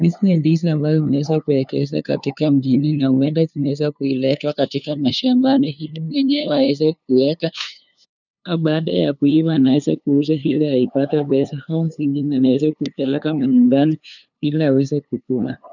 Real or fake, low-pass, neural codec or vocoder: fake; 7.2 kHz; codec, 16 kHz, 2 kbps, FunCodec, trained on LibriTTS, 25 frames a second